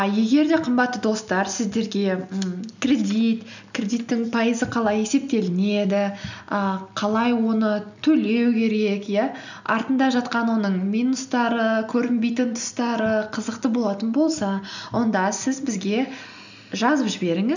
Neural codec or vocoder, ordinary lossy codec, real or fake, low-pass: none; none; real; 7.2 kHz